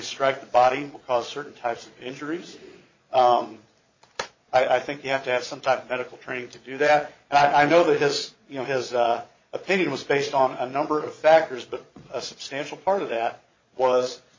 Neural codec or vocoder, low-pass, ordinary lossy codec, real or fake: vocoder, 22.05 kHz, 80 mel bands, Vocos; 7.2 kHz; MP3, 32 kbps; fake